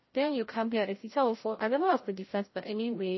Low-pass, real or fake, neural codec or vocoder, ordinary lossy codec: 7.2 kHz; fake; codec, 16 kHz, 0.5 kbps, FreqCodec, larger model; MP3, 24 kbps